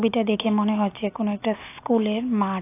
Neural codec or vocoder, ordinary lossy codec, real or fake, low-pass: none; AAC, 24 kbps; real; 3.6 kHz